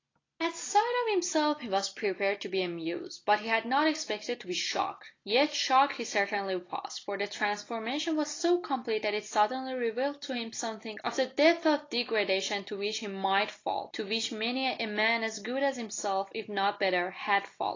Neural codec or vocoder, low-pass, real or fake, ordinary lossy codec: none; 7.2 kHz; real; AAC, 32 kbps